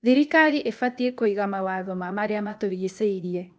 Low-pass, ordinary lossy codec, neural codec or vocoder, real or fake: none; none; codec, 16 kHz, 0.8 kbps, ZipCodec; fake